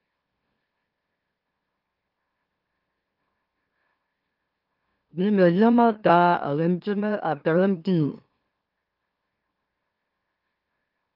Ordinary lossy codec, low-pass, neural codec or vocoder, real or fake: Opus, 24 kbps; 5.4 kHz; autoencoder, 44.1 kHz, a latent of 192 numbers a frame, MeloTTS; fake